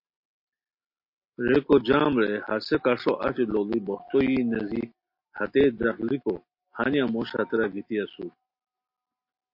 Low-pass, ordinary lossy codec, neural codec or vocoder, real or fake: 5.4 kHz; MP3, 32 kbps; none; real